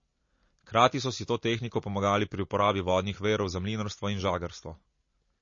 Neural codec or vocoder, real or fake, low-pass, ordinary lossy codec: none; real; 7.2 kHz; MP3, 32 kbps